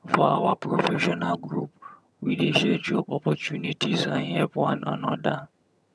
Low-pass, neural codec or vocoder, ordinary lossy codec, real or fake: none; vocoder, 22.05 kHz, 80 mel bands, HiFi-GAN; none; fake